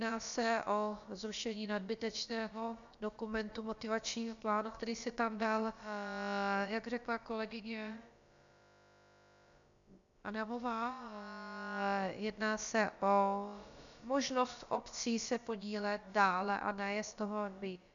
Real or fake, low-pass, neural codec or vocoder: fake; 7.2 kHz; codec, 16 kHz, about 1 kbps, DyCAST, with the encoder's durations